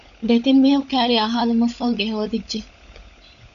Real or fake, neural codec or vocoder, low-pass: fake; codec, 16 kHz, 16 kbps, FunCodec, trained on LibriTTS, 50 frames a second; 7.2 kHz